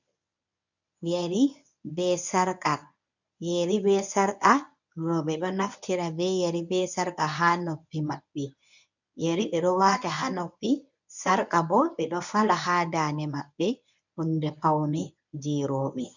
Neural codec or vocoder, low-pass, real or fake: codec, 24 kHz, 0.9 kbps, WavTokenizer, medium speech release version 1; 7.2 kHz; fake